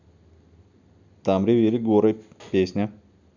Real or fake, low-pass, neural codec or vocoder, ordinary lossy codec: real; 7.2 kHz; none; none